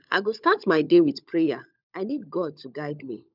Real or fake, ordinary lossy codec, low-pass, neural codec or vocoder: fake; none; 5.4 kHz; codec, 16 kHz, 16 kbps, FunCodec, trained on LibriTTS, 50 frames a second